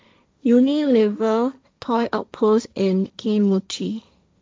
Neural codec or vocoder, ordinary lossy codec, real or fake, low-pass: codec, 16 kHz, 1.1 kbps, Voila-Tokenizer; none; fake; none